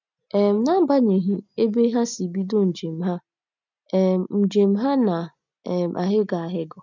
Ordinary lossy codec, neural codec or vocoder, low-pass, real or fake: none; none; 7.2 kHz; real